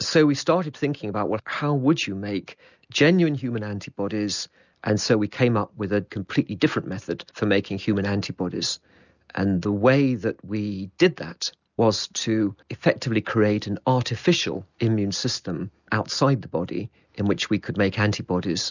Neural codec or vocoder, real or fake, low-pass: none; real; 7.2 kHz